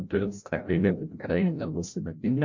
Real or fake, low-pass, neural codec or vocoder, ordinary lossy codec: fake; 7.2 kHz; codec, 16 kHz, 0.5 kbps, FreqCodec, larger model; MP3, 48 kbps